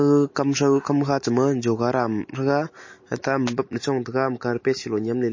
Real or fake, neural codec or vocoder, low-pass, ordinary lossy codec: real; none; 7.2 kHz; MP3, 32 kbps